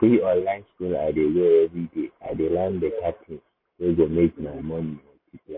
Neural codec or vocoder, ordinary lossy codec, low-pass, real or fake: none; MP3, 24 kbps; 5.4 kHz; real